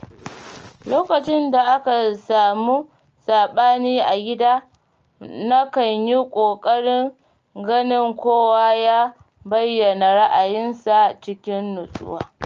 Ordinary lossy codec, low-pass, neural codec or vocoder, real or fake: Opus, 24 kbps; 7.2 kHz; none; real